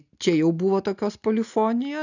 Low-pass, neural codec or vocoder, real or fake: 7.2 kHz; none; real